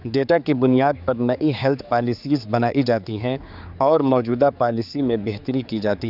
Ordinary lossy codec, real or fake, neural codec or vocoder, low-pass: none; fake; codec, 16 kHz, 4 kbps, X-Codec, HuBERT features, trained on general audio; 5.4 kHz